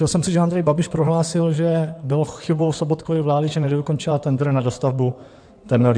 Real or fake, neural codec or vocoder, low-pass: fake; codec, 16 kHz in and 24 kHz out, 2.2 kbps, FireRedTTS-2 codec; 9.9 kHz